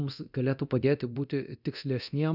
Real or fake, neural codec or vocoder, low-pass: fake; codec, 24 kHz, 0.9 kbps, DualCodec; 5.4 kHz